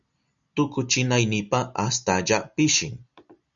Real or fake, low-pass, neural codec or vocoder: real; 7.2 kHz; none